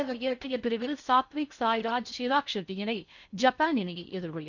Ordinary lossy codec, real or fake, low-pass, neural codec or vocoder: none; fake; 7.2 kHz; codec, 16 kHz in and 24 kHz out, 0.6 kbps, FocalCodec, streaming, 4096 codes